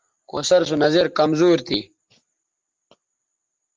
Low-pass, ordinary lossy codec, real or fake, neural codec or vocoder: 7.2 kHz; Opus, 24 kbps; real; none